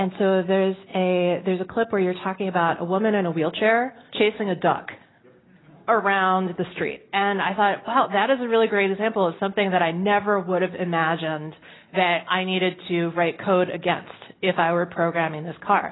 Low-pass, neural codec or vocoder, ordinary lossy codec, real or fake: 7.2 kHz; none; AAC, 16 kbps; real